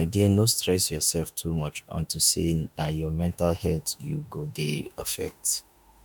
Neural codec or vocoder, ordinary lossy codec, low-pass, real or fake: autoencoder, 48 kHz, 32 numbers a frame, DAC-VAE, trained on Japanese speech; none; none; fake